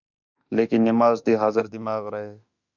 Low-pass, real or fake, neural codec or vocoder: 7.2 kHz; fake; autoencoder, 48 kHz, 32 numbers a frame, DAC-VAE, trained on Japanese speech